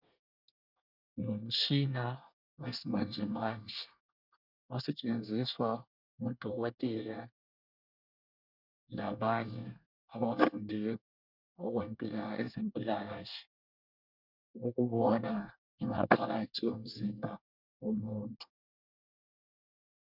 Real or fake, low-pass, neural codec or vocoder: fake; 5.4 kHz; codec, 24 kHz, 1 kbps, SNAC